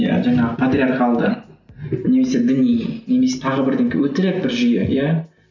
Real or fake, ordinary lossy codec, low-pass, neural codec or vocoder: real; none; 7.2 kHz; none